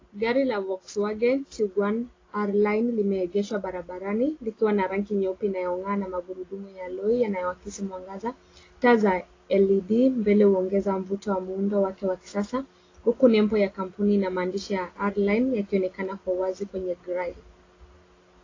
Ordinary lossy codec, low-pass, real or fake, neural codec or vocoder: AAC, 32 kbps; 7.2 kHz; real; none